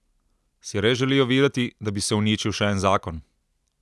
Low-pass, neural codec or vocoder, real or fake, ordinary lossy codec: none; none; real; none